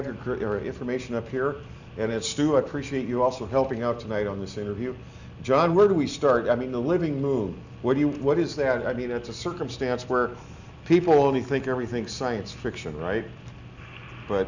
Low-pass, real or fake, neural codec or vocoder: 7.2 kHz; real; none